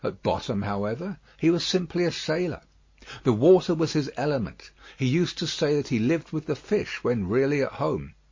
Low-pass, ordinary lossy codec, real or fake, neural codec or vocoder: 7.2 kHz; MP3, 32 kbps; real; none